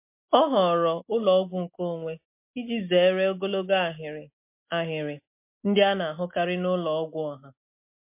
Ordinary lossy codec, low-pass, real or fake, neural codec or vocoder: MP3, 24 kbps; 3.6 kHz; real; none